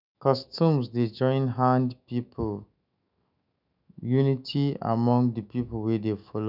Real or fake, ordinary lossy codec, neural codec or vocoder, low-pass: fake; none; autoencoder, 48 kHz, 128 numbers a frame, DAC-VAE, trained on Japanese speech; 5.4 kHz